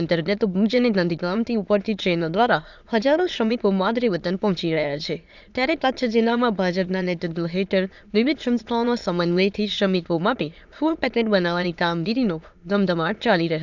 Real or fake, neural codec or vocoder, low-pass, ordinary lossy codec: fake; autoencoder, 22.05 kHz, a latent of 192 numbers a frame, VITS, trained on many speakers; 7.2 kHz; none